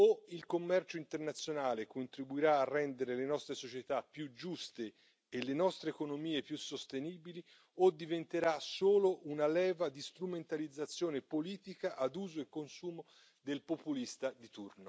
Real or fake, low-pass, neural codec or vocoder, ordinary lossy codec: real; none; none; none